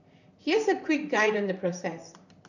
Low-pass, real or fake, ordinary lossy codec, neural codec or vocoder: 7.2 kHz; fake; none; vocoder, 44.1 kHz, 128 mel bands, Pupu-Vocoder